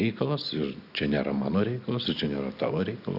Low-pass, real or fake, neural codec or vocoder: 5.4 kHz; real; none